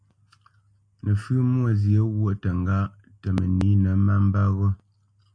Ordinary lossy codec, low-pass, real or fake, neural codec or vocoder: AAC, 64 kbps; 9.9 kHz; real; none